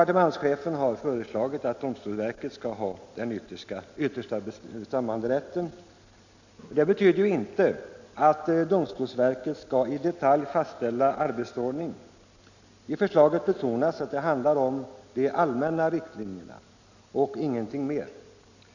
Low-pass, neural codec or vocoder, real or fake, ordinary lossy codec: 7.2 kHz; none; real; none